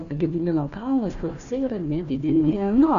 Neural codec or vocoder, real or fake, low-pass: codec, 16 kHz, 1 kbps, FunCodec, trained on Chinese and English, 50 frames a second; fake; 7.2 kHz